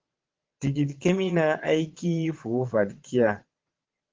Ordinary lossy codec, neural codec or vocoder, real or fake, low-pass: Opus, 16 kbps; vocoder, 22.05 kHz, 80 mel bands, Vocos; fake; 7.2 kHz